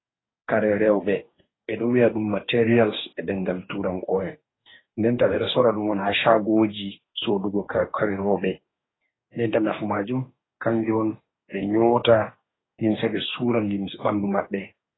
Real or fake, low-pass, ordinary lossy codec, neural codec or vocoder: fake; 7.2 kHz; AAC, 16 kbps; codec, 44.1 kHz, 2.6 kbps, DAC